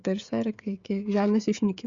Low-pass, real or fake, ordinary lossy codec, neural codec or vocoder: 7.2 kHz; fake; Opus, 64 kbps; codec, 16 kHz, 16 kbps, FreqCodec, smaller model